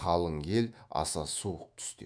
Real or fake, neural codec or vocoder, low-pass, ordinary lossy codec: fake; autoencoder, 48 kHz, 128 numbers a frame, DAC-VAE, trained on Japanese speech; 9.9 kHz; none